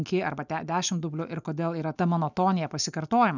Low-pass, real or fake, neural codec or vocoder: 7.2 kHz; real; none